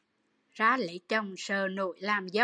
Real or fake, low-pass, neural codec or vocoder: real; 9.9 kHz; none